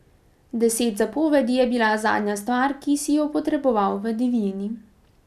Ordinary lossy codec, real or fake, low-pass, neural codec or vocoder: none; real; 14.4 kHz; none